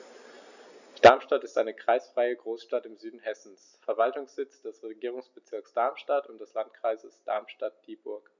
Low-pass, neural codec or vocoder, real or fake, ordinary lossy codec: 7.2 kHz; none; real; none